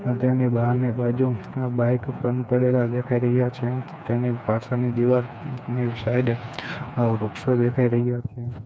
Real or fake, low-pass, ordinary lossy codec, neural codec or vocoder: fake; none; none; codec, 16 kHz, 4 kbps, FreqCodec, smaller model